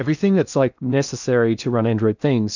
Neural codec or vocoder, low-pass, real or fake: codec, 16 kHz in and 24 kHz out, 0.8 kbps, FocalCodec, streaming, 65536 codes; 7.2 kHz; fake